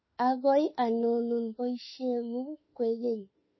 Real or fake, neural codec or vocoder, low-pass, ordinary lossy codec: fake; autoencoder, 48 kHz, 32 numbers a frame, DAC-VAE, trained on Japanese speech; 7.2 kHz; MP3, 24 kbps